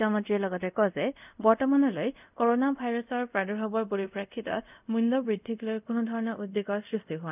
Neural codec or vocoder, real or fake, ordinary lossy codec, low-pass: codec, 24 kHz, 0.5 kbps, DualCodec; fake; none; 3.6 kHz